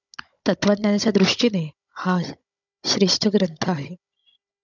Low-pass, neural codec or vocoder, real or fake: 7.2 kHz; codec, 16 kHz, 16 kbps, FunCodec, trained on Chinese and English, 50 frames a second; fake